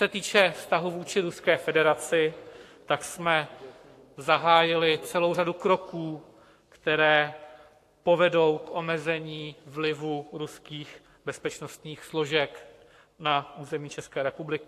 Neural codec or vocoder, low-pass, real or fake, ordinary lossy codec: codec, 44.1 kHz, 7.8 kbps, Pupu-Codec; 14.4 kHz; fake; AAC, 64 kbps